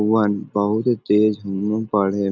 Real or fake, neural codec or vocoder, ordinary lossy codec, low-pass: real; none; none; 7.2 kHz